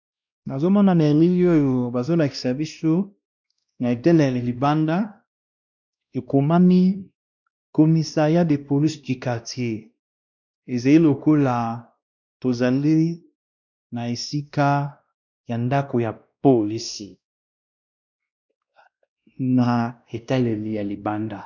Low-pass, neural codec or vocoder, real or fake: 7.2 kHz; codec, 16 kHz, 1 kbps, X-Codec, WavLM features, trained on Multilingual LibriSpeech; fake